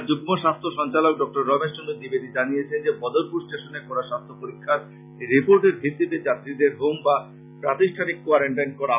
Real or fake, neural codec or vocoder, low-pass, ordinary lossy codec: real; none; 3.6 kHz; none